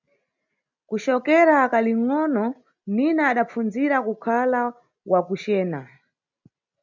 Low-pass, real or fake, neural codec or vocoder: 7.2 kHz; real; none